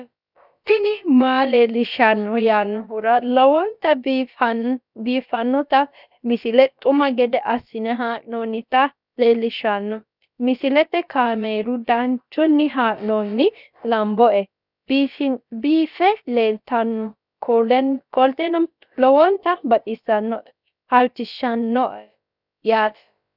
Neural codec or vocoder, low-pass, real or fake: codec, 16 kHz, about 1 kbps, DyCAST, with the encoder's durations; 5.4 kHz; fake